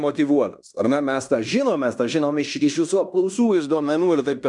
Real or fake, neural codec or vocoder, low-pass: fake; codec, 16 kHz in and 24 kHz out, 0.9 kbps, LongCat-Audio-Codec, fine tuned four codebook decoder; 10.8 kHz